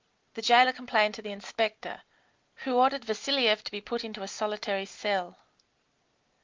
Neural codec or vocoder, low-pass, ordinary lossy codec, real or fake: none; 7.2 kHz; Opus, 24 kbps; real